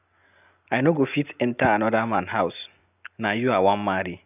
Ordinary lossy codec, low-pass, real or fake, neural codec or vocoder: none; 3.6 kHz; real; none